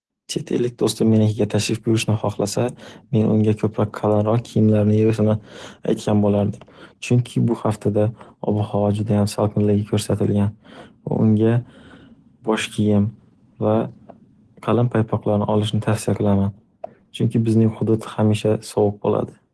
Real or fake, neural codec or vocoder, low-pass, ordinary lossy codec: real; none; 10.8 kHz; Opus, 16 kbps